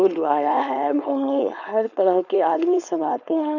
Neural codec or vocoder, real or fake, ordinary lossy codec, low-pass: codec, 16 kHz, 4.8 kbps, FACodec; fake; none; 7.2 kHz